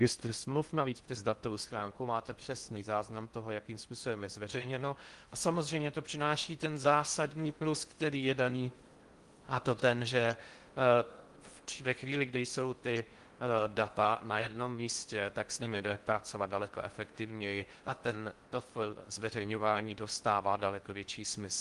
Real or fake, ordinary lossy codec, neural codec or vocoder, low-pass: fake; Opus, 24 kbps; codec, 16 kHz in and 24 kHz out, 0.6 kbps, FocalCodec, streaming, 4096 codes; 10.8 kHz